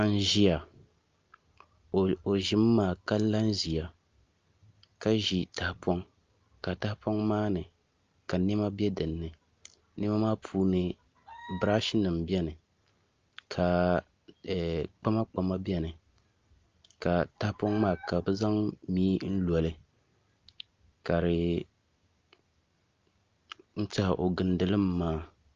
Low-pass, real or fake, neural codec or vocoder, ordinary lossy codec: 7.2 kHz; real; none; Opus, 24 kbps